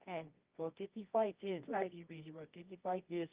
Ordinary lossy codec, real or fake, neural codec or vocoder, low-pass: Opus, 24 kbps; fake; codec, 24 kHz, 0.9 kbps, WavTokenizer, medium music audio release; 3.6 kHz